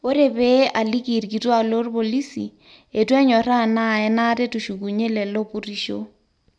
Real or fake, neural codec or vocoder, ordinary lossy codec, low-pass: real; none; none; 9.9 kHz